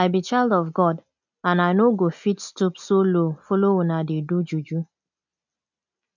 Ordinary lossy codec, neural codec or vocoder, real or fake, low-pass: none; none; real; 7.2 kHz